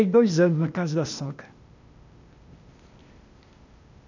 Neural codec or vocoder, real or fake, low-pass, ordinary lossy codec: codec, 16 kHz, 0.8 kbps, ZipCodec; fake; 7.2 kHz; none